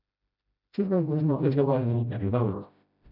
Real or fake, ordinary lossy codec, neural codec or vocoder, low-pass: fake; none; codec, 16 kHz, 0.5 kbps, FreqCodec, smaller model; 5.4 kHz